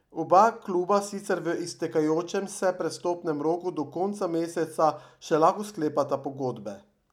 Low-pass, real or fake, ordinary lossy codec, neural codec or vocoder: 19.8 kHz; real; none; none